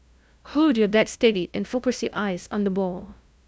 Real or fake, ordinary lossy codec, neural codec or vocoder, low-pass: fake; none; codec, 16 kHz, 0.5 kbps, FunCodec, trained on LibriTTS, 25 frames a second; none